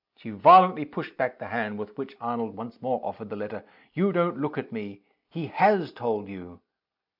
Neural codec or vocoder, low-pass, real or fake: none; 5.4 kHz; real